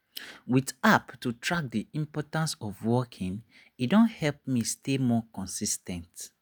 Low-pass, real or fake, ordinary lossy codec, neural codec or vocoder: none; real; none; none